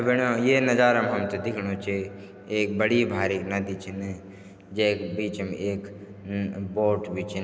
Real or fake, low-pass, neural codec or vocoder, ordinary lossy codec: real; none; none; none